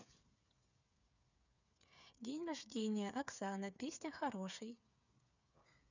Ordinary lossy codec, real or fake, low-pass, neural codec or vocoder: none; fake; 7.2 kHz; codec, 16 kHz, 8 kbps, FreqCodec, smaller model